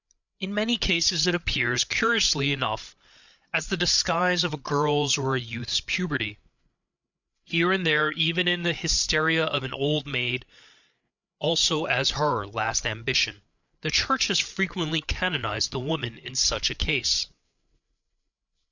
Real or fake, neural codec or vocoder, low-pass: fake; codec, 16 kHz, 8 kbps, FreqCodec, larger model; 7.2 kHz